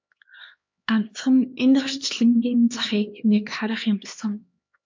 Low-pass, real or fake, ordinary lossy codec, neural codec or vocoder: 7.2 kHz; fake; MP3, 48 kbps; codec, 16 kHz, 2 kbps, X-Codec, HuBERT features, trained on LibriSpeech